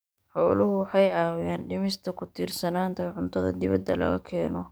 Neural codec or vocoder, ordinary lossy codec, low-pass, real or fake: codec, 44.1 kHz, 7.8 kbps, DAC; none; none; fake